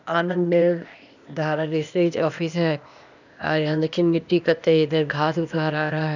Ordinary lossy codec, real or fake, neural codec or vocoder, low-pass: none; fake; codec, 16 kHz, 0.8 kbps, ZipCodec; 7.2 kHz